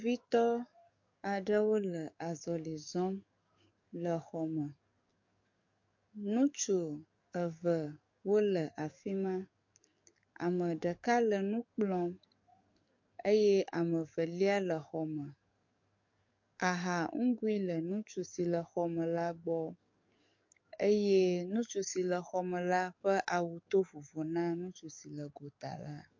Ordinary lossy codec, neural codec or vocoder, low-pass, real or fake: MP3, 48 kbps; codec, 44.1 kHz, 7.8 kbps, DAC; 7.2 kHz; fake